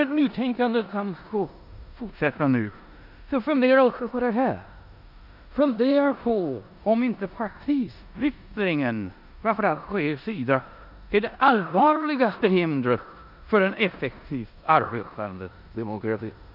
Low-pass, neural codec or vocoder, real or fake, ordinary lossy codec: 5.4 kHz; codec, 16 kHz in and 24 kHz out, 0.9 kbps, LongCat-Audio-Codec, four codebook decoder; fake; none